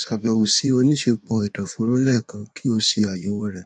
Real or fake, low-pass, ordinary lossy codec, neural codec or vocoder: fake; 9.9 kHz; none; codec, 16 kHz in and 24 kHz out, 1.1 kbps, FireRedTTS-2 codec